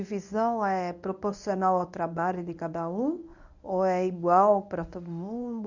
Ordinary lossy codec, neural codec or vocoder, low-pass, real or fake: none; codec, 24 kHz, 0.9 kbps, WavTokenizer, medium speech release version 1; 7.2 kHz; fake